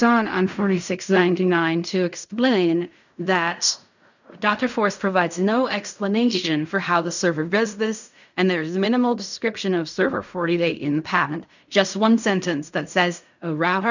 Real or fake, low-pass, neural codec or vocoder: fake; 7.2 kHz; codec, 16 kHz in and 24 kHz out, 0.4 kbps, LongCat-Audio-Codec, fine tuned four codebook decoder